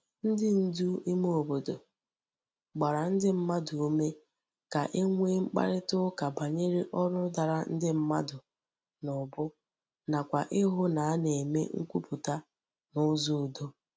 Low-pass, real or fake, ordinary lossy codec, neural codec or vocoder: none; real; none; none